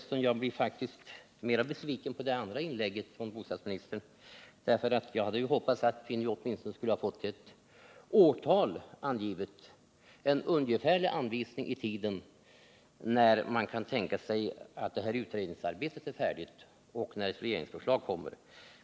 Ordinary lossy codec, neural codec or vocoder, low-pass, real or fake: none; none; none; real